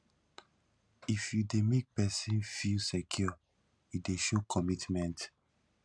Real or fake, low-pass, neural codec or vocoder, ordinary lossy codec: real; 9.9 kHz; none; none